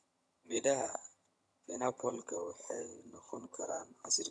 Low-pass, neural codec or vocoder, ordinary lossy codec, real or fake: none; vocoder, 22.05 kHz, 80 mel bands, HiFi-GAN; none; fake